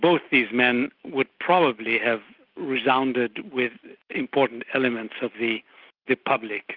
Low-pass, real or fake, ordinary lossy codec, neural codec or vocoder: 5.4 kHz; real; Opus, 24 kbps; none